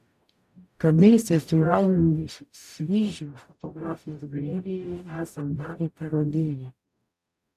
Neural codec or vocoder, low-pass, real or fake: codec, 44.1 kHz, 0.9 kbps, DAC; 14.4 kHz; fake